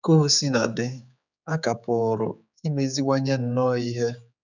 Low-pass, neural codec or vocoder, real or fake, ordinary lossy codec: 7.2 kHz; codec, 16 kHz, 4 kbps, X-Codec, HuBERT features, trained on general audio; fake; none